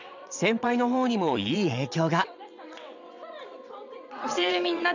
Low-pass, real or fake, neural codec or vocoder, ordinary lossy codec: 7.2 kHz; fake; vocoder, 22.05 kHz, 80 mel bands, WaveNeXt; none